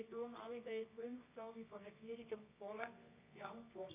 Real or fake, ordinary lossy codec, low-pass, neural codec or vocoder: fake; MP3, 24 kbps; 3.6 kHz; codec, 24 kHz, 0.9 kbps, WavTokenizer, medium music audio release